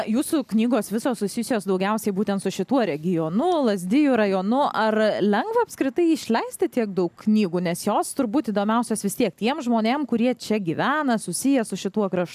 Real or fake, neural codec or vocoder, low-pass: real; none; 14.4 kHz